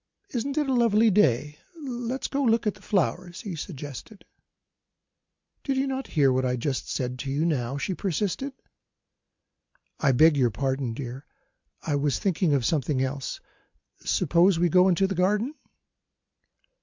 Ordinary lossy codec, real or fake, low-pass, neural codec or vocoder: MP3, 64 kbps; real; 7.2 kHz; none